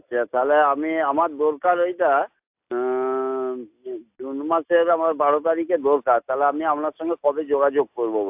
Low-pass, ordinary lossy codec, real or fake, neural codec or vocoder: 3.6 kHz; AAC, 32 kbps; real; none